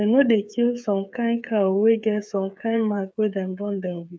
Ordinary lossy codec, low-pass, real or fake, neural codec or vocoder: none; none; fake; codec, 16 kHz, 8 kbps, FreqCodec, smaller model